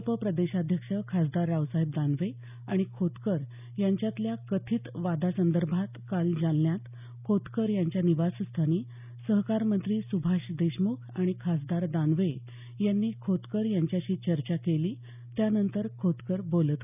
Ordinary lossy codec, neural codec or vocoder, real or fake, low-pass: none; none; real; 3.6 kHz